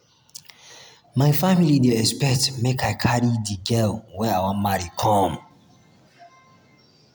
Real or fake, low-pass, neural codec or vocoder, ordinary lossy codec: real; none; none; none